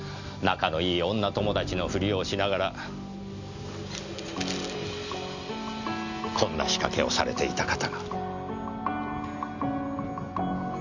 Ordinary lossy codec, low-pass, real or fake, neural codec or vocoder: none; 7.2 kHz; real; none